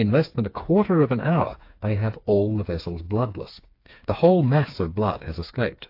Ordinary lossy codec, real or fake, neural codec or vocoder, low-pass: AAC, 32 kbps; fake; codec, 16 kHz, 4 kbps, FreqCodec, smaller model; 5.4 kHz